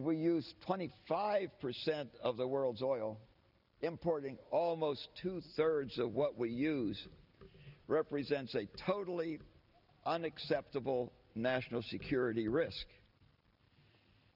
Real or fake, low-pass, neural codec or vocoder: real; 5.4 kHz; none